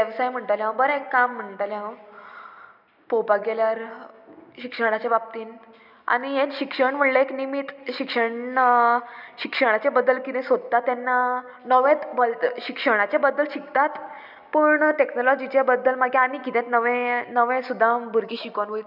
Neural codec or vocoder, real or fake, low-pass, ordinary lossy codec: none; real; 5.4 kHz; none